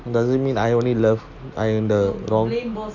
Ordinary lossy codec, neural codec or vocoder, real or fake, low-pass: AAC, 48 kbps; none; real; 7.2 kHz